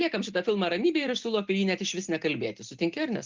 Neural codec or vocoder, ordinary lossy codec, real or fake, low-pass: none; Opus, 32 kbps; real; 7.2 kHz